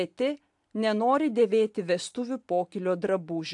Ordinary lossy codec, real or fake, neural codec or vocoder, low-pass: AAC, 64 kbps; real; none; 10.8 kHz